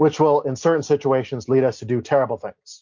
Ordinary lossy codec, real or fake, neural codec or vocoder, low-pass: MP3, 48 kbps; real; none; 7.2 kHz